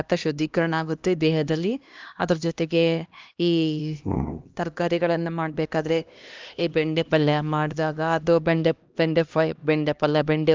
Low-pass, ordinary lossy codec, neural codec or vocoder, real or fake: 7.2 kHz; Opus, 24 kbps; codec, 16 kHz, 1 kbps, X-Codec, HuBERT features, trained on LibriSpeech; fake